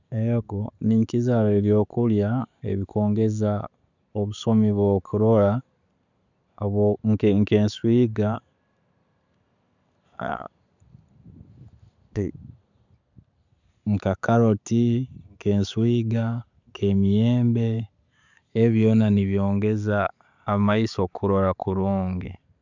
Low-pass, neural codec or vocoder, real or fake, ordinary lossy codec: 7.2 kHz; none; real; none